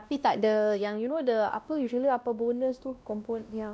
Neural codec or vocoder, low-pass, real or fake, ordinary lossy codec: codec, 16 kHz, 1 kbps, X-Codec, WavLM features, trained on Multilingual LibriSpeech; none; fake; none